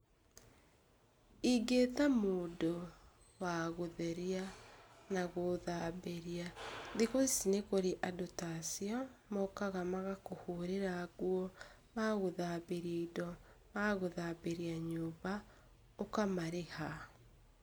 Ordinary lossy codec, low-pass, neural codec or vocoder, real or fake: none; none; none; real